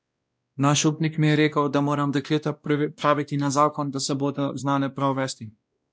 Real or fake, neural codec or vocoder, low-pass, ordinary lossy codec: fake; codec, 16 kHz, 1 kbps, X-Codec, WavLM features, trained on Multilingual LibriSpeech; none; none